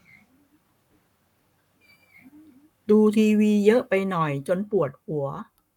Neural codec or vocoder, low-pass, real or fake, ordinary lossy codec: codec, 44.1 kHz, 7.8 kbps, Pupu-Codec; 19.8 kHz; fake; none